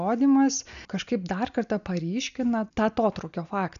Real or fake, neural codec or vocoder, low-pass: real; none; 7.2 kHz